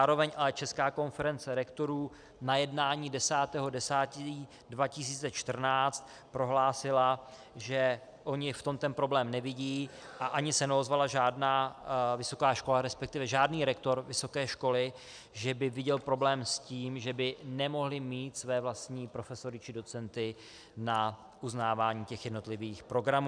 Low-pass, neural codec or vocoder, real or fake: 9.9 kHz; none; real